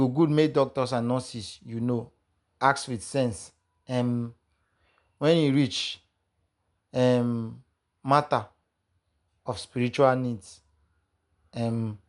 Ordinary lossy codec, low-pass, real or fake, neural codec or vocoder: none; 10.8 kHz; real; none